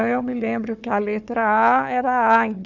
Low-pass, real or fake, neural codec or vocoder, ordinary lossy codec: 7.2 kHz; fake; codec, 16 kHz, 4 kbps, FunCodec, trained on Chinese and English, 50 frames a second; none